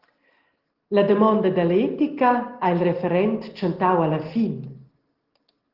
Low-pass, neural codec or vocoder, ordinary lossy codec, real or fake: 5.4 kHz; none; Opus, 16 kbps; real